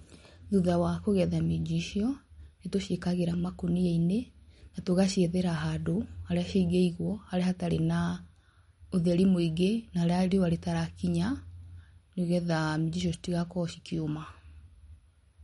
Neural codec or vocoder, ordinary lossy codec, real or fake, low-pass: none; MP3, 48 kbps; real; 19.8 kHz